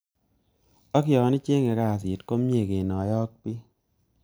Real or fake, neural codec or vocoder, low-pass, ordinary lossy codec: real; none; none; none